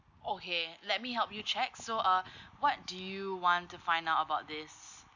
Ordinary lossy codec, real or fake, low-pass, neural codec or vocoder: none; real; 7.2 kHz; none